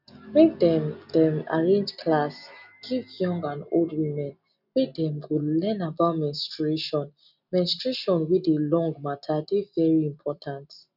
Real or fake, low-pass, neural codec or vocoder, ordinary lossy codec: real; 5.4 kHz; none; none